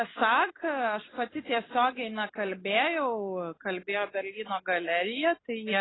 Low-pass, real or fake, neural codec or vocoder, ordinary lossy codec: 7.2 kHz; real; none; AAC, 16 kbps